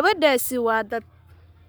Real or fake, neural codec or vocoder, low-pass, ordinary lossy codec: fake; codec, 44.1 kHz, 7.8 kbps, Pupu-Codec; none; none